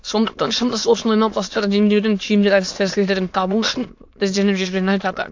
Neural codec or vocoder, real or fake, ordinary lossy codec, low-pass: autoencoder, 22.05 kHz, a latent of 192 numbers a frame, VITS, trained on many speakers; fake; AAC, 48 kbps; 7.2 kHz